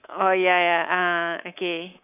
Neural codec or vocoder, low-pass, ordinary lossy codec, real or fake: codec, 24 kHz, 3.1 kbps, DualCodec; 3.6 kHz; none; fake